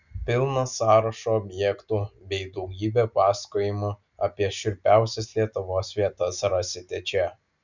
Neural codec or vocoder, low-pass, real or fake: none; 7.2 kHz; real